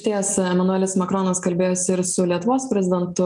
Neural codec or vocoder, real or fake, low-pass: none; real; 10.8 kHz